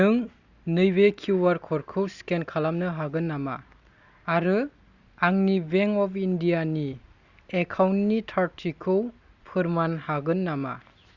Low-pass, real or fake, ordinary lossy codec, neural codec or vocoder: 7.2 kHz; real; Opus, 64 kbps; none